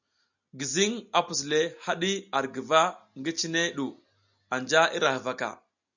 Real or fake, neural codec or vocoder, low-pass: real; none; 7.2 kHz